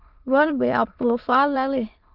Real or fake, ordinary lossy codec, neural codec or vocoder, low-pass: fake; Opus, 32 kbps; autoencoder, 22.05 kHz, a latent of 192 numbers a frame, VITS, trained on many speakers; 5.4 kHz